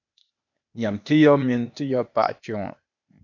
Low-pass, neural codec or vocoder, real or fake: 7.2 kHz; codec, 16 kHz, 0.8 kbps, ZipCodec; fake